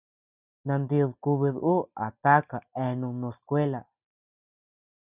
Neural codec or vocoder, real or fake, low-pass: none; real; 3.6 kHz